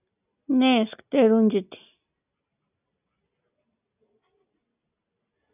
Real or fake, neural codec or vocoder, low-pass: real; none; 3.6 kHz